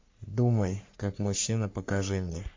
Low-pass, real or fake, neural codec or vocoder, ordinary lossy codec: 7.2 kHz; fake; codec, 44.1 kHz, 3.4 kbps, Pupu-Codec; MP3, 48 kbps